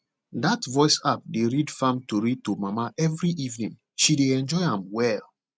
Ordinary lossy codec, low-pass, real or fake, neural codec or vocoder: none; none; real; none